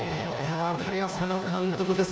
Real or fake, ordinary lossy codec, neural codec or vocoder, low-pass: fake; none; codec, 16 kHz, 1 kbps, FunCodec, trained on LibriTTS, 50 frames a second; none